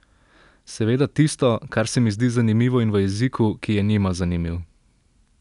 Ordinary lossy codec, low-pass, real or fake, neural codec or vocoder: none; 10.8 kHz; real; none